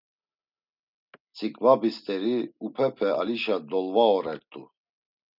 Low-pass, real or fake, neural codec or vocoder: 5.4 kHz; real; none